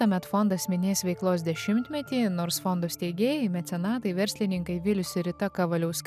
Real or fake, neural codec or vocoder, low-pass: real; none; 14.4 kHz